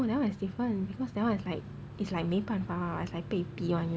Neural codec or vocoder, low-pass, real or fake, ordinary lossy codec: none; none; real; none